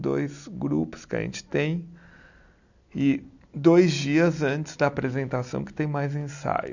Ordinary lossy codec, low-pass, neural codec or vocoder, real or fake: none; 7.2 kHz; none; real